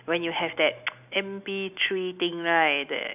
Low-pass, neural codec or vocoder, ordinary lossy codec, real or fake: 3.6 kHz; none; none; real